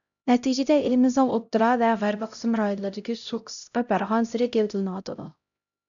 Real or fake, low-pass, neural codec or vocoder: fake; 7.2 kHz; codec, 16 kHz, 0.5 kbps, X-Codec, HuBERT features, trained on LibriSpeech